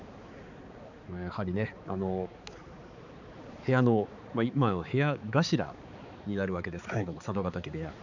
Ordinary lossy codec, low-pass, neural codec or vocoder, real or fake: none; 7.2 kHz; codec, 16 kHz, 4 kbps, X-Codec, HuBERT features, trained on balanced general audio; fake